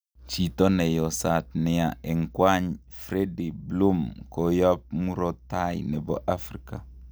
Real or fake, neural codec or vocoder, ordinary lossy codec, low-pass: real; none; none; none